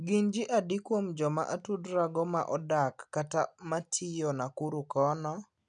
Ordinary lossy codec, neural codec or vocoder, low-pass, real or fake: none; none; 9.9 kHz; real